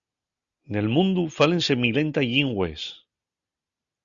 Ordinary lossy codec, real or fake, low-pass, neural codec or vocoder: Opus, 64 kbps; real; 7.2 kHz; none